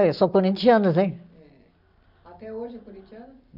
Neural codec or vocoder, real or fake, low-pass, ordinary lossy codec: none; real; 5.4 kHz; none